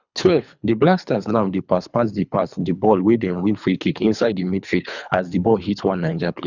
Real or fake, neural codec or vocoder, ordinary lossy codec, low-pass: fake; codec, 24 kHz, 3 kbps, HILCodec; none; 7.2 kHz